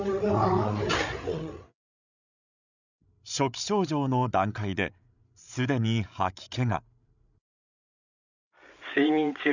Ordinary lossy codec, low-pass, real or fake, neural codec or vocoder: none; 7.2 kHz; fake; codec, 16 kHz, 8 kbps, FreqCodec, larger model